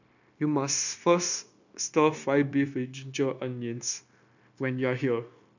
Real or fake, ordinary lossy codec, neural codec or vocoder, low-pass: fake; none; codec, 16 kHz, 0.9 kbps, LongCat-Audio-Codec; 7.2 kHz